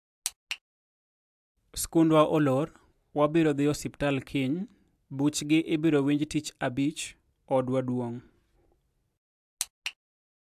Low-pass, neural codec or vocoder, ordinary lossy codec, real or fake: 14.4 kHz; none; AAC, 96 kbps; real